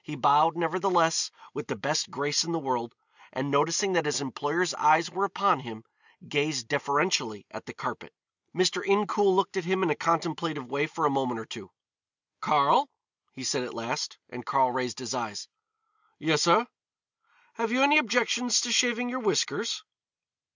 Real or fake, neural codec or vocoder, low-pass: real; none; 7.2 kHz